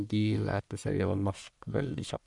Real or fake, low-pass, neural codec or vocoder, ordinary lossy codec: fake; 10.8 kHz; codec, 44.1 kHz, 1.7 kbps, Pupu-Codec; none